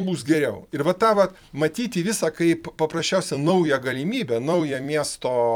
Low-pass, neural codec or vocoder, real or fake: 19.8 kHz; vocoder, 44.1 kHz, 128 mel bands every 256 samples, BigVGAN v2; fake